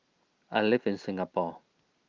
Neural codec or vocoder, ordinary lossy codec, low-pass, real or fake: none; Opus, 32 kbps; 7.2 kHz; real